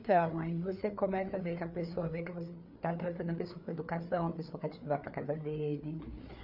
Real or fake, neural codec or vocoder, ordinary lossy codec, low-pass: fake; codec, 16 kHz, 4 kbps, FreqCodec, larger model; none; 5.4 kHz